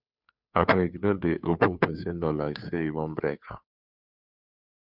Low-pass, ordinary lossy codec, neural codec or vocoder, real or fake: 5.4 kHz; none; codec, 16 kHz, 2 kbps, FunCodec, trained on Chinese and English, 25 frames a second; fake